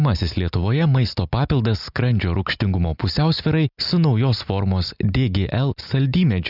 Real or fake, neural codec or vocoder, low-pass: real; none; 5.4 kHz